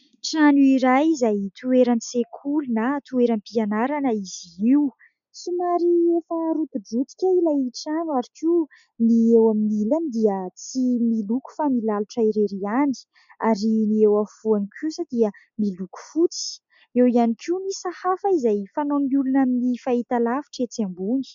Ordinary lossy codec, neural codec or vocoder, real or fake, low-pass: MP3, 64 kbps; none; real; 7.2 kHz